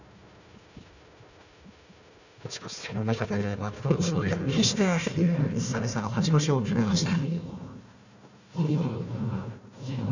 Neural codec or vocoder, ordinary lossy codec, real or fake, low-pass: codec, 16 kHz, 1 kbps, FunCodec, trained on Chinese and English, 50 frames a second; none; fake; 7.2 kHz